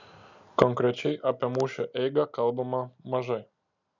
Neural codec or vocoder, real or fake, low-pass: none; real; 7.2 kHz